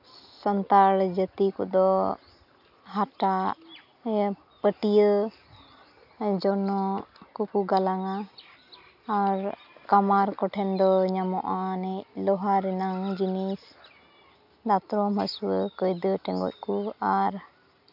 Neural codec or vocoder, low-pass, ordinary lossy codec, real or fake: none; 5.4 kHz; none; real